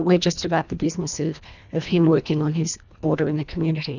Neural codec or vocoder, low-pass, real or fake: codec, 24 kHz, 1.5 kbps, HILCodec; 7.2 kHz; fake